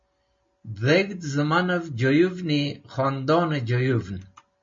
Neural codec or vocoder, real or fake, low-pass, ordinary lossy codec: none; real; 7.2 kHz; MP3, 32 kbps